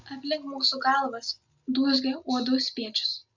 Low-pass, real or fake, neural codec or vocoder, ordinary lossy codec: 7.2 kHz; real; none; MP3, 64 kbps